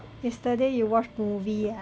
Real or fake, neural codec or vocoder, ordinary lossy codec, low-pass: real; none; none; none